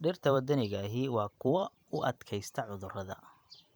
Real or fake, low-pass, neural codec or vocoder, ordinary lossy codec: fake; none; vocoder, 44.1 kHz, 128 mel bands every 512 samples, BigVGAN v2; none